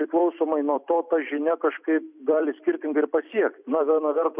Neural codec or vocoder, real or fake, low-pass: vocoder, 44.1 kHz, 128 mel bands every 256 samples, BigVGAN v2; fake; 3.6 kHz